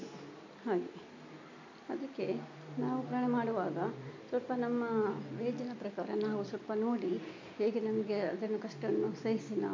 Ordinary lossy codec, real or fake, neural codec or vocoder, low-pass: MP3, 48 kbps; real; none; 7.2 kHz